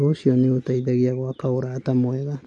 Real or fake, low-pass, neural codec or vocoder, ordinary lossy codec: real; 10.8 kHz; none; AAC, 64 kbps